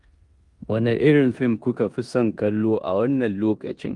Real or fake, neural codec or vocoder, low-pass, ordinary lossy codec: fake; codec, 16 kHz in and 24 kHz out, 0.9 kbps, LongCat-Audio-Codec, four codebook decoder; 10.8 kHz; Opus, 32 kbps